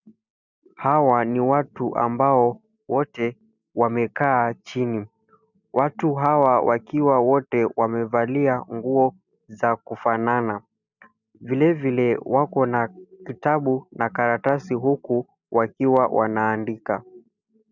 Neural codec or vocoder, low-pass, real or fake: none; 7.2 kHz; real